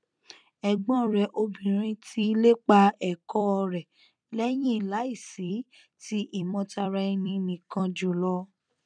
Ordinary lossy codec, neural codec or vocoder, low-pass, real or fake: none; vocoder, 44.1 kHz, 128 mel bands every 256 samples, BigVGAN v2; 9.9 kHz; fake